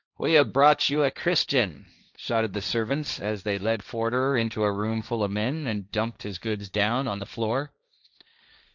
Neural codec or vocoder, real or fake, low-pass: codec, 16 kHz, 1.1 kbps, Voila-Tokenizer; fake; 7.2 kHz